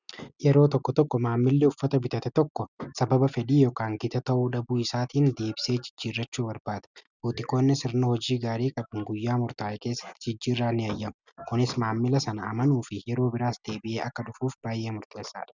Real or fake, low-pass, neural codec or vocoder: real; 7.2 kHz; none